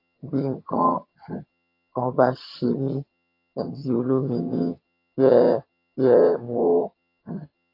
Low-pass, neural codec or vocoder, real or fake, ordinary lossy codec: 5.4 kHz; vocoder, 22.05 kHz, 80 mel bands, HiFi-GAN; fake; AAC, 32 kbps